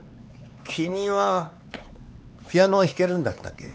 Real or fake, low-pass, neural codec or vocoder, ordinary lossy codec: fake; none; codec, 16 kHz, 4 kbps, X-Codec, HuBERT features, trained on LibriSpeech; none